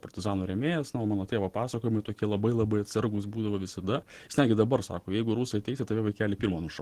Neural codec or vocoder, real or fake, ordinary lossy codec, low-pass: none; real; Opus, 16 kbps; 14.4 kHz